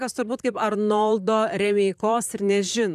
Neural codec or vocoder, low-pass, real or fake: vocoder, 44.1 kHz, 128 mel bands, Pupu-Vocoder; 14.4 kHz; fake